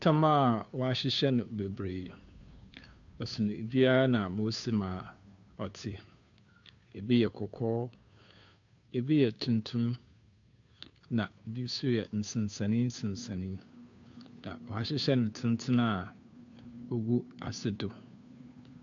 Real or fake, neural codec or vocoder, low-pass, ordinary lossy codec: fake; codec, 16 kHz, 2 kbps, FunCodec, trained on Chinese and English, 25 frames a second; 7.2 kHz; AAC, 64 kbps